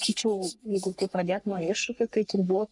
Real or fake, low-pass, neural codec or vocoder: fake; 10.8 kHz; codec, 44.1 kHz, 3.4 kbps, Pupu-Codec